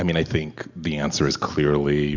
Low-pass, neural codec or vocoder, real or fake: 7.2 kHz; none; real